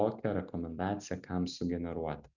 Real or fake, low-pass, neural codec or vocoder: real; 7.2 kHz; none